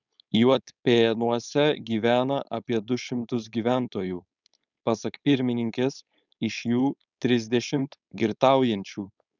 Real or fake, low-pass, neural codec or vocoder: fake; 7.2 kHz; codec, 16 kHz, 4.8 kbps, FACodec